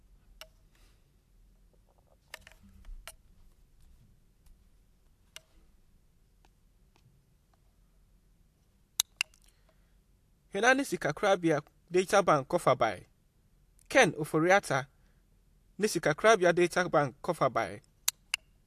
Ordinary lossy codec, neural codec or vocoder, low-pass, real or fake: AAC, 64 kbps; none; 14.4 kHz; real